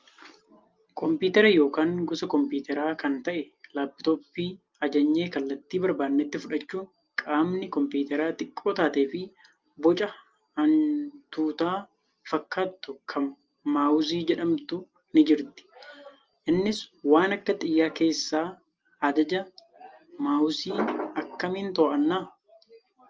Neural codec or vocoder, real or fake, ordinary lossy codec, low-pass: none; real; Opus, 24 kbps; 7.2 kHz